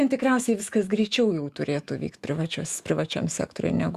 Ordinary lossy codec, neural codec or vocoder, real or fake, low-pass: Opus, 64 kbps; autoencoder, 48 kHz, 128 numbers a frame, DAC-VAE, trained on Japanese speech; fake; 14.4 kHz